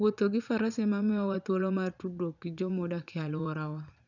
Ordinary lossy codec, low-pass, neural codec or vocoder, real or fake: none; 7.2 kHz; vocoder, 44.1 kHz, 80 mel bands, Vocos; fake